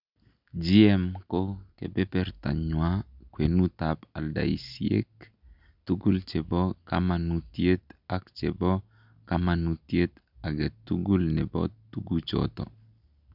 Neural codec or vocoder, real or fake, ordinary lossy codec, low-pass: none; real; none; 5.4 kHz